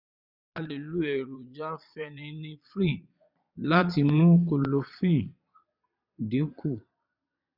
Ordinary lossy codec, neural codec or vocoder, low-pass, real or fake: none; vocoder, 22.05 kHz, 80 mel bands, Vocos; 5.4 kHz; fake